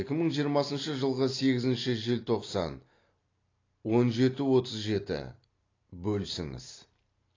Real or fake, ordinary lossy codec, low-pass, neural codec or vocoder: real; AAC, 32 kbps; 7.2 kHz; none